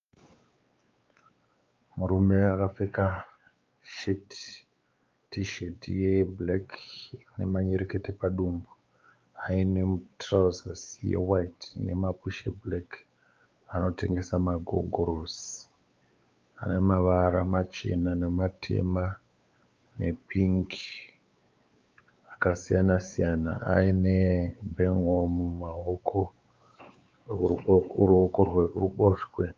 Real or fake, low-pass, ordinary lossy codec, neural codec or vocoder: fake; 7.2 kHz; Opus, 24 kbps; codec, 16 kHz, 4 kbps, X-Codec, WavLM features, trained on Multilingual LibriSpeech